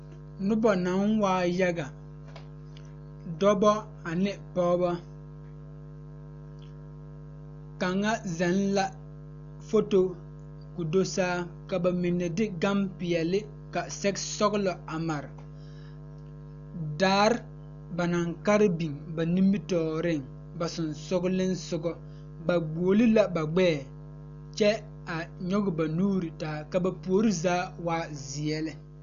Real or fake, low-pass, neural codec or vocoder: real; 7.2 kHz; none